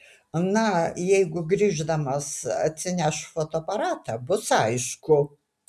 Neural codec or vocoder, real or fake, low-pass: none; real; 14.4 kHz